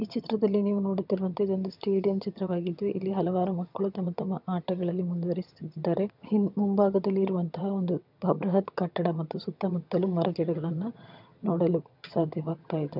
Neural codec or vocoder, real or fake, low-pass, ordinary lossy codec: vocoder, 22.05 kHz, 80 mel bands, HiFi-GAN; fake; 5.4 kHz; none